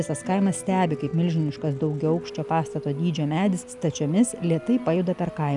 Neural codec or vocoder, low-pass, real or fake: none; 10.8 kHz; real